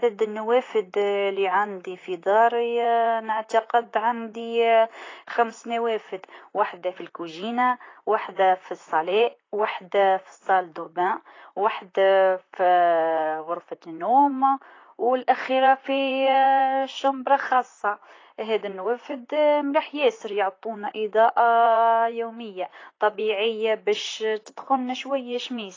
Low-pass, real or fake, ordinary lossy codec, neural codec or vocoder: 7.2 kHz; fake; AAC, 32 kbps; vocoder, 44.1 kHz, 128 mel bands, Pupu-Vocoder